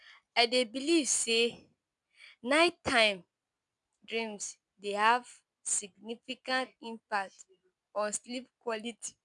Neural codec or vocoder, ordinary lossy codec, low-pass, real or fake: none; none; 10.8 kHz; real